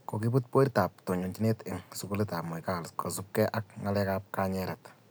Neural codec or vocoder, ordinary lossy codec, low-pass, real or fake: none; none; none; real